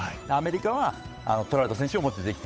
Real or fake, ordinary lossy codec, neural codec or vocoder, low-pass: fake; none; codec, 16 kHz, 8 kbps, FunCodec, trained on Chinese and English, 25 frames a second; none